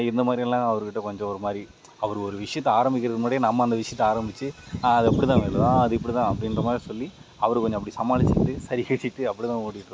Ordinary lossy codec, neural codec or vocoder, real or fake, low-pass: none; none; real; none